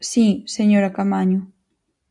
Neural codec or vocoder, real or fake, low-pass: none; real; 10.8 kHz